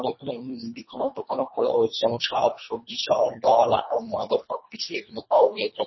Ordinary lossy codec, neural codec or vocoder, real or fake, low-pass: MP3, 24 kbps; codec, 24 kHz, 1.5 kbps, HILCodec; fake; 7.2 kHz